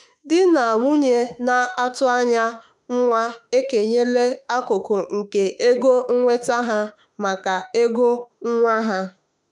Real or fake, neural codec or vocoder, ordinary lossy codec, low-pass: fake; autoencoder, 48 kHz, 32 numbers a frame, DAC-VAE, trained on Japanese speech; none; 10.8 kHz